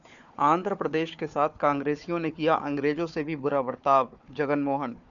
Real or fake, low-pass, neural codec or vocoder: fake; 7.2 kHz; codec, 16 kHz, 4 kbps, FunCodec, trained on Chinese and English, 50 frames a second